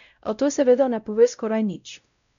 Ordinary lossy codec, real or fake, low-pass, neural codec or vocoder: none; fake; 7.2 kHz; codec, 16 kHz, 0.5 kbps, X-Codec, WavLM features, trained on Multilingual LibriSpeech